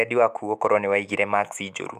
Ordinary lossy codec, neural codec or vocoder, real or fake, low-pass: none; autoencoder, 48 kHz, 128 numbers a frame, DAC-VAE, trained on Japanese speech; fake; 14.4 kHz